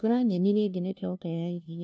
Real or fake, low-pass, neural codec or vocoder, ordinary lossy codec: fake; none; codec, 16 kHz, 0.5 kbps, FunCodec, trained on LibriTTS, 25 frames a second; none